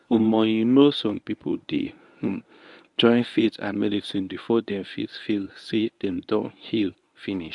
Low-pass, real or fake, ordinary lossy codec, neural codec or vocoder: 10.8 kHz; fake; none; codec, 24 kHz, 0.9 kbps, WavTokenizer, medium speech release version 1